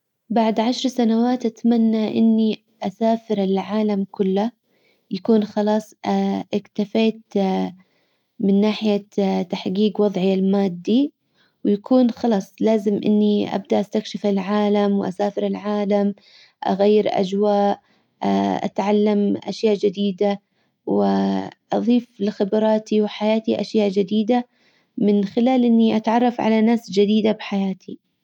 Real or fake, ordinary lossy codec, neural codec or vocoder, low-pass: real; none; none; 19.8 kHz